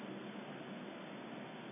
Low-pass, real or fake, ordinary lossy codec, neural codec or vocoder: 3.6 kHz; real; none; none